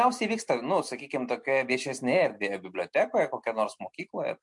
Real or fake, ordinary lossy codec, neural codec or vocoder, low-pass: real; MP3, 64 kbps; none; 10.8 kHz